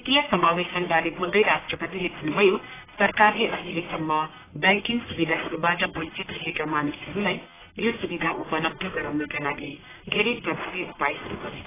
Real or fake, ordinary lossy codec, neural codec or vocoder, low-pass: fake; AAC, 16 kbps; codec, 44.1 kHz, 1.7 kbps, Pupu-Codec; 3.6 kHz